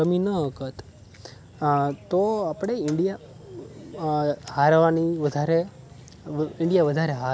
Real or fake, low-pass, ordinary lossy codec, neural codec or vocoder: real; none; none; none